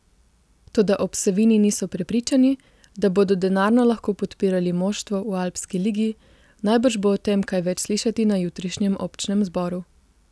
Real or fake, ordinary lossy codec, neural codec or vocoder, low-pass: real; none; none; none